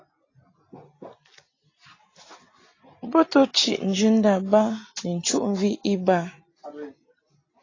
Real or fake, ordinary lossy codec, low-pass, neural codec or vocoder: real; AAC, 32 kbps; 7.2 kHz; none